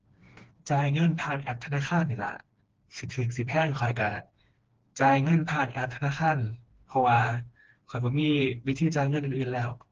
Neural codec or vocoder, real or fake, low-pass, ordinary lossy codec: codec, 16 kHz, 2 kbps, FreqCodec, smaller model; fake; 7.2 kHz; Opus, 16 kbps